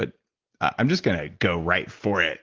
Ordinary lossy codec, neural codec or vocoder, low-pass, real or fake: Opus, 16 kbps; none; 7.2 kHz; real